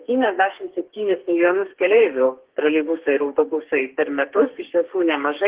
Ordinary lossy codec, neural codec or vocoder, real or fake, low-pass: Opus, 16 kbps; codec, 32 kHz, 1.9 kbps, SNAC; fake; 3.6 kHz